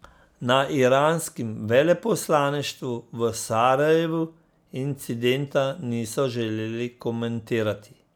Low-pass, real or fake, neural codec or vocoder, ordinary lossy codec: none; real; none; none